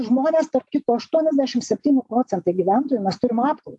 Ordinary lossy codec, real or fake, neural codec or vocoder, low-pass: AAC, 64 kbps; real; none; 10.8 kHz